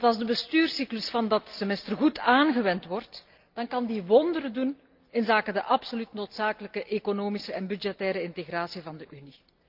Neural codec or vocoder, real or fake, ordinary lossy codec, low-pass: none; real; Opus, 24 kbps; 5.4 kHz